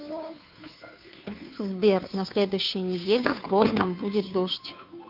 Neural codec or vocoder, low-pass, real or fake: codec, 16 kHz, 2 kbps, FunCodec, trained on Chinese and English, 25 frames a second; 5.4 kHz; fake